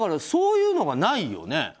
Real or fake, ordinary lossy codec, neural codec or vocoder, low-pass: real; none; none; none